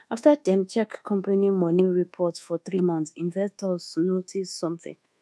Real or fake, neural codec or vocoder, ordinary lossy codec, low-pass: fake; codec, 24 kHz, 1.2 kbps, DualCodec; none; none